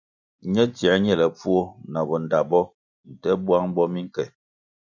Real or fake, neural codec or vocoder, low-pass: real; none; 7.2 kHz